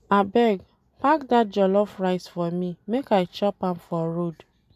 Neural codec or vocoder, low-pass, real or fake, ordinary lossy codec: none; 14.4 kHz; real; none